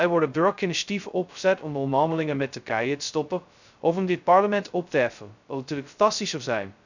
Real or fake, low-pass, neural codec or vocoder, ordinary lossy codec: fake; 7.2 kHz; codec, 16 kHz, 0.2 kbps, FocalCodec; none